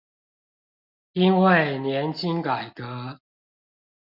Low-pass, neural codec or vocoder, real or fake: 5.4 kHz; none; real